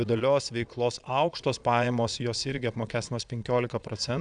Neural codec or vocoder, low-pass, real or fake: vocoder, 22.05 kHz, 80 mel bands, Vocos; 9.9 kHz; fake